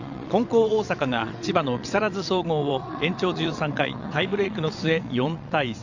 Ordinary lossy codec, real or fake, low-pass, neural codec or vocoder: none; fake; 7.2 kHz; vocoder, 22.05 kHz, 80 mel bands, WaveNeXt